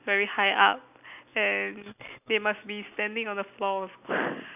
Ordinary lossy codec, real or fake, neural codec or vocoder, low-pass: none; real; none; 3.6 kHz